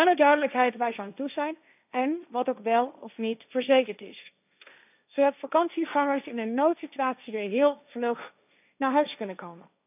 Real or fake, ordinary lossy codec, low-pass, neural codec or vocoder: fake; none; 3.6 kHz; codec, 16 kHz, 1.1 kbps, Voila-Tokenizer